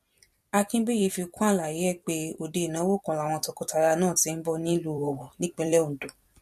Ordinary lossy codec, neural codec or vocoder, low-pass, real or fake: MP3, 64 kbps; vocoder, 44.1 kHz, 128 mel bands every 256 samples, BigVGAN v2; 14.4 kHz; fake